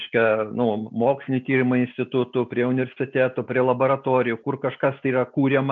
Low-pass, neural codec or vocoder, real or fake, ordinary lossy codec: 7.2 kHz; none; real; MP3, 64 kbps